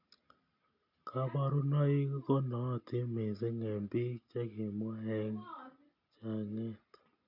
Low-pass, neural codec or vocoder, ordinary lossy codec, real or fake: 5.4 kHz; none; none; real